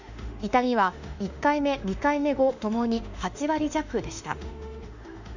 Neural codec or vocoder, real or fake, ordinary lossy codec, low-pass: autoencoder, 48 kHz, 32 numbers a frame, DAC-VAE, trained on Japanese speech; fake; none; 7.2 kHz